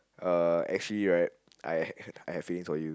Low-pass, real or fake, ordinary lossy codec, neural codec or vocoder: none; real; none; none